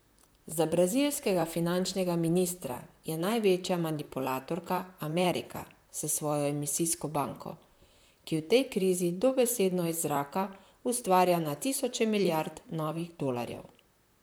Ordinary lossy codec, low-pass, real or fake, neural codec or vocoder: none; none; fake; vocoder, 44.1 kHz, 128 mel bands, Pupu-Vocoder